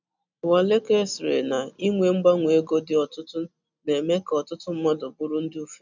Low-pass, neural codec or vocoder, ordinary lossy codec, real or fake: 7.2 kHz; none; none; real